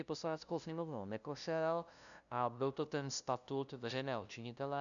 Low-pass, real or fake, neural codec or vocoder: 7.2 kHz; fake; codec, 16 kHz, 0.5 kbps, FunCodec, trained on LibriTTS, 25 frames a second